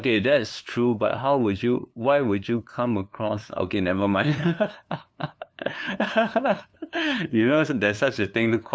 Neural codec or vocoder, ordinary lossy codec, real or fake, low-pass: codec, 16 kHz, 2 kbps, FunCodec, trained on LibriTTS, 25 frames a second; none; fake; none